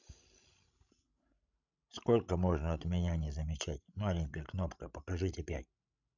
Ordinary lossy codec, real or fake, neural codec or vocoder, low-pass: none; fake; codec, 16 kHz, 16 kbps, FreqCodec, larger model; 7.2 kHz